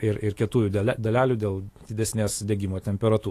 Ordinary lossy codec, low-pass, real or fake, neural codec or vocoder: AAC, 48 kbps; 14.4 kHz; fake; autoencoder, 48 kHz, 128 numbers a frame, DAC-VAE, trained on Japanese speech